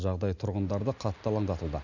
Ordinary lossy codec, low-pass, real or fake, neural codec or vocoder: none; 7.2 kHz; real; none